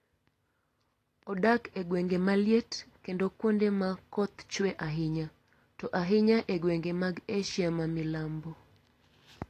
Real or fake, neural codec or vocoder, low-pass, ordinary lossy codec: real; none; 14.4 kHz; AAC, 48 kbps